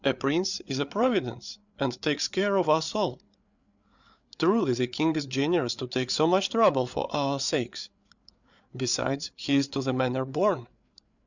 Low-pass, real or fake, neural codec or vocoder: 7.2 kHz; fake; codec, 16 kHz, 8 kbps, FreqCodec, larger model